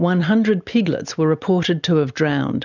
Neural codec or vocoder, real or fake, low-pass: none; real; 7.2 kHz